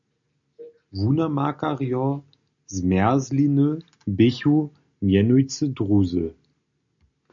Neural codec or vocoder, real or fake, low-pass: none; real; 7.2 kHz